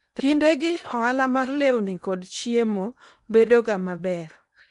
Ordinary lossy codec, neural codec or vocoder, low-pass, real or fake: none; codec, 16 kHz in and 24 kHz out, 0.8 kbps, FocalCodec, streaming, 65536 codes; 10.8 kHz; fake